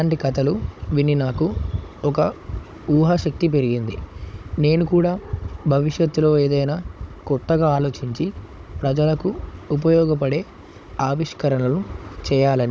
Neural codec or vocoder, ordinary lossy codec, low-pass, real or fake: codec, 16 kHz, 16 kbps, FunCodec, trained on Chinese and English, 50 frames a second; none; none; fake